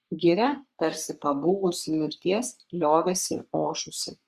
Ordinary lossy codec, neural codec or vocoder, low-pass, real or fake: Opus, 64 kbps; codec, 44.1 kHz, 3.4 kbps, Pupu-Codec; 14.4 kHz; fake